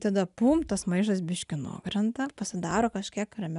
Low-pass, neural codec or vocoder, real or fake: 10.8 kHz; none; real